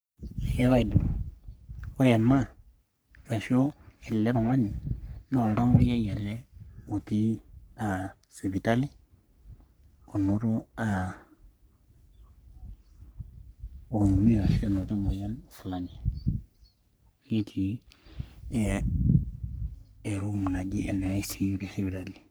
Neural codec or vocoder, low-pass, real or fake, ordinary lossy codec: codec, 44.1 kHz, 3.4 kbps, Pupu-Codec; none; fake; none